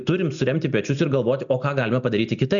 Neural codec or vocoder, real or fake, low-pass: none; real; 7.2 kHz